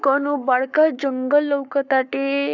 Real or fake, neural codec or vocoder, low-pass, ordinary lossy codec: fake; codec, 44.1 kHz, 7.8 kbps, Pupu-Codec; 7.2 kHz; none